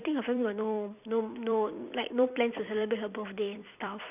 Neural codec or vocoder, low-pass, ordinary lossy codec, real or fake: vocoder, 44.1 kHz, 128 mel bands every 256 samples, BigVGAN v2; 3.6 kHz; none; fake